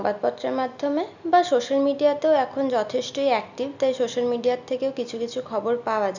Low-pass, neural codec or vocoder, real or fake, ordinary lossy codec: 7.2 kHz; none; real; none